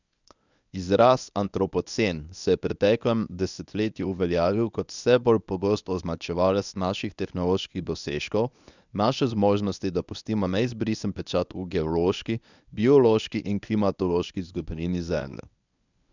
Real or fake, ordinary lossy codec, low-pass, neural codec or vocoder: fake; none; 7.2 kHz; codec, 24 kHz, 0.9 kbps, WavTokenizer, medium speech release version 1